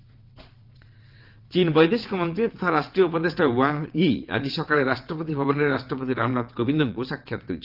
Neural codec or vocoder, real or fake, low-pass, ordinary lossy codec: vocoder, 22.05 kHz, 80 mel bands, WaveNeXt; fake; 5.4 kHz; Opus, 32 kbps